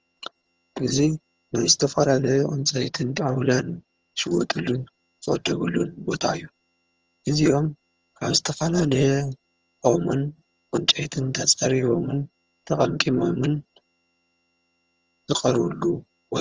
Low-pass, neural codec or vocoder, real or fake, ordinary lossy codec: 7.2 kHz; vocoder, 22.05 kHz, 80 mel bands, HiFi-GAN; fake; Opus, 24 kbps